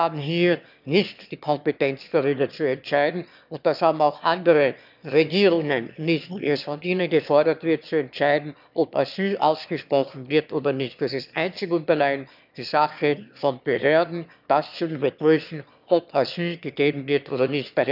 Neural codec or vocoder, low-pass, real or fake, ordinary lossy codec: autoencoder, 22.05 kHz, a latent of 192 numbers a frame, VITS, trained on one speaker; 5.4 kHz; fake; none